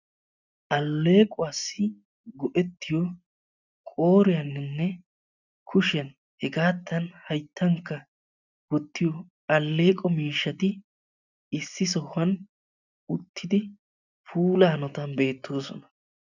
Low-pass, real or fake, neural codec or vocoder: 7.2 kHz; real; none